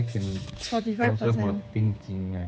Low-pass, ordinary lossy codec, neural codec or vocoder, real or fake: none; none; codec, 16 kHz, 4 kbps, X-Codec, HuBERT features, trained on general audio; fake